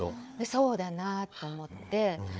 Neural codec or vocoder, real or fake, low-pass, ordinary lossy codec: codec, 16 kHz, 4 kbps, FunCodec, trained on Chinese and English, 50 frames a second; fake; none; none